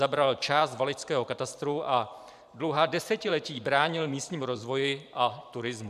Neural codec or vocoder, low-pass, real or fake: vocoder, 44.1 kHz, 128 mel bands every 512 samples, BigVGAN v2; 14.4 kHz; fake